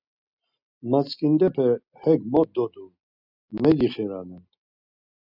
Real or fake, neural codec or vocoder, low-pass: real; none; 5.4 kHz